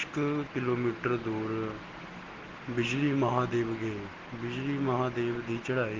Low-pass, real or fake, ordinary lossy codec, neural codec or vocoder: 7.2 kHz; real; Opus, 16 kbps; none